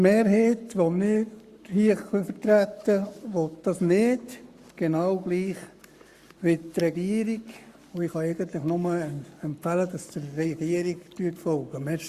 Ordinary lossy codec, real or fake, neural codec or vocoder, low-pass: Opus, 64 kbps; fake; codec, 44.1 kHz, 7.8 kbps, Pupu-Codec; 14.4 kHz